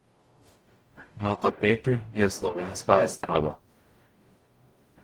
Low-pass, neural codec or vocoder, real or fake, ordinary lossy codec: 19.8 kHz; codec, 44.1 kHz, 0.9 kbps, DAC; fake; Opus, 24 kbps